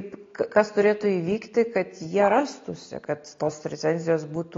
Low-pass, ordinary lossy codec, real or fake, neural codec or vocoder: 7.2 kHz; AAC, 32 kbps; real; none